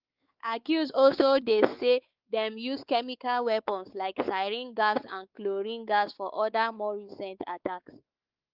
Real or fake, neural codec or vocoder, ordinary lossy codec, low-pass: fake; codec, 16 kHz, 4 kbps, X-Codec, WavLM features, trained on Multilingual LibriSpeech; Opus, 24 kbps; 5.4 kHz